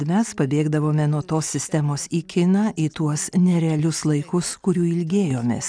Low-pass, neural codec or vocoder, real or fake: 9.9 kHz; none; real